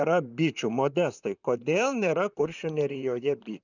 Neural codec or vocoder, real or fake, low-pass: vocoder, 44.1 kHz, 128 mel bands, Pupu-Vocoder; fake; 7.2 kHz